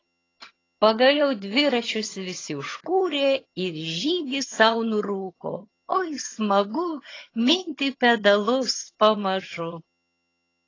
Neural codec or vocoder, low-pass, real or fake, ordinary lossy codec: vocoder, 22.05 kHz, 80 mel bands, HiFi-GAN; 7.2 kHz; fake; AAC, 32 kbps